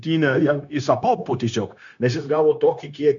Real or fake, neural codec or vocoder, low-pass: fake; codec, 16 kHz, 0.9 kbps, LongCat-Audio-Codec; 7.2 kHz